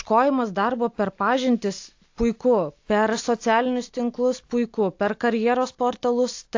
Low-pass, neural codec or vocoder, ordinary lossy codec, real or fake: 7.2 kHz; none; AAC, 48 kbps; real